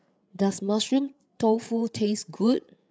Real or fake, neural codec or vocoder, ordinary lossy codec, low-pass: fake; codec, 16 kHz, 8 kbps, FreqCodec, larger model; none; none